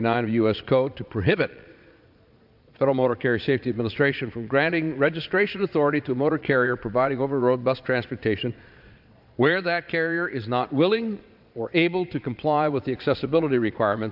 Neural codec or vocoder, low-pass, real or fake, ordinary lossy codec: vocoder, 22.05 kHz, 80 mel bands, Vocos; 5.4 kHz; fake; AAC, 48 kbps